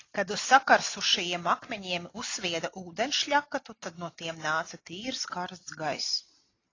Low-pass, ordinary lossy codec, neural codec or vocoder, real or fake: 7.2 kHz; AAC, 32 kbps; none; real